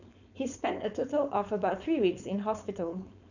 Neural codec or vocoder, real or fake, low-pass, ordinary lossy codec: codec, 16 kHz, 4.8 kbps, FACodec; fake; 7.2 kHz; none